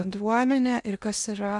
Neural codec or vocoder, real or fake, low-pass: codec, 16 kHz in and 24 kHz out, 0.8 kbps, FocalCodec, streaming, 65536 codes; fake; 10.8 kHz